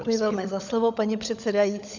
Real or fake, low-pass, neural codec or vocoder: fake; 7.2 kHz; codec, 16 kHz, 16 kbps, FunCodec, trained on LibriTTS, 50 frames a second